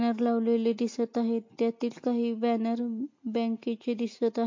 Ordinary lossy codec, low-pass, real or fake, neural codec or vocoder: MP3, 48 kbps; 7.2 kHz; real; none